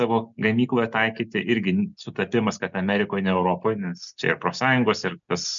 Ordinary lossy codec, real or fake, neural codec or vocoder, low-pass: MP3, 96 kbps; real; none; 7.2 kHz